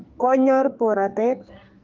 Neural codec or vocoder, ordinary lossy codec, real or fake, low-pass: codec, 44.1 kHz, 2.6 kbps, SNAC; Opus, 32 kbps; fake; 7.2 kHz